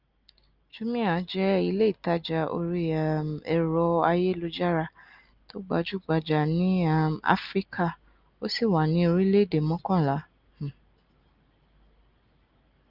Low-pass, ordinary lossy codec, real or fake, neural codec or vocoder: 5.4 kHz; Opus, 32 kbps; real; none